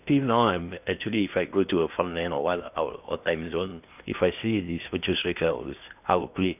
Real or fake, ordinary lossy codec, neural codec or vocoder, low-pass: fake; none; codec, 16 kHz in and 24 kHz out, 0.8 kbps, FocalCodec, streaming, 65536 codes; 3.6 kHz